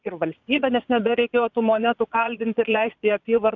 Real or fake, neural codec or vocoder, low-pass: fake; vocoder, 22.05 kHz, 80 mel bands, Vocos; 7.2 kHz